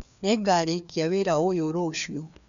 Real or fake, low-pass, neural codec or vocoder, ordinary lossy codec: fake; 7.2 kHz; codec, 16 kHz, 2 kbps, FreqCodec, larger model; none